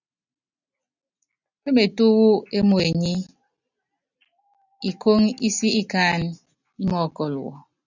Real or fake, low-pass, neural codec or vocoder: real; 7.2 kHz; none